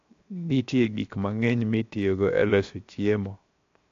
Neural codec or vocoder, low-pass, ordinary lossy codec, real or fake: codec, 16 kHz, 0.7 kbps, FocalCodec; 7.2 kHz; AAC, 48 kbps; fake